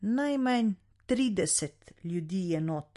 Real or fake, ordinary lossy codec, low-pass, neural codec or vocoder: real; MP3, 48 kbps; 14.4 kHz; none